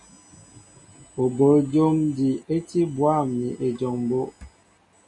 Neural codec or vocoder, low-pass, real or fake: none; 10.8 kHz; real